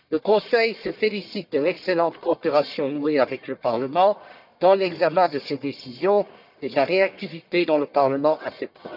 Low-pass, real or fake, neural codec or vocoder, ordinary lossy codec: 5.4 kHz; fake; codec, 44.1 kHz, 1.7 kbps, Pupu-Codec; none